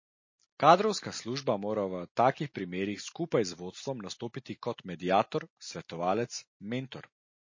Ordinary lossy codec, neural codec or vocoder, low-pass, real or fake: MP3, 32 kbps; none; 7.2 kHz; real